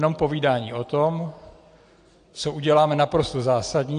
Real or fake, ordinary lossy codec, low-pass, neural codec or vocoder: real; AAC, 48 kbps; 9.9 kHz; none